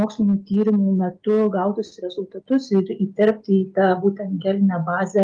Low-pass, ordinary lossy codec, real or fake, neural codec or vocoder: 9.9 kHz; Opus, 32 kbps; real; none